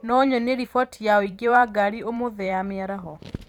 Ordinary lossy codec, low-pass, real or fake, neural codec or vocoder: none; 19.8 kHz; fake; vocoder, 44.1 kHz, 128 mel bands every 512 samples, BigVGAN v2